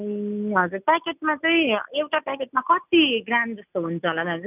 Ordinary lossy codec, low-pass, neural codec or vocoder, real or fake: none; 3.6 kHz; none; real